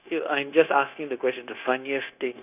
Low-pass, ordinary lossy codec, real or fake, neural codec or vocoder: 3.6 kHz; none; fake; codec, 16 kHz, 0.9 kbps, LongCat-Audio-Codec